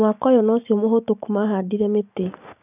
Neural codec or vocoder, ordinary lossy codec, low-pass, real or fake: none; none; 3.6 kHz; real